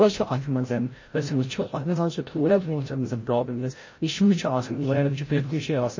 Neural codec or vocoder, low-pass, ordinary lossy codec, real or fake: codec, 16 kHz, 0.5 kbps, FreqCodec, larger model; 7.2 kHz; MP3, 32 kbps; fake